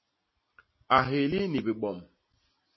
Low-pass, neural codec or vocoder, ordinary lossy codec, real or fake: 7.2 kHz; none; MP3, 24 kbps; real